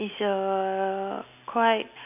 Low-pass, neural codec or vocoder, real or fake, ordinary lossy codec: 3.6 kHz; none; real; none